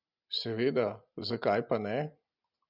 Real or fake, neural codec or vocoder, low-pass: real; none; 5.4 kHz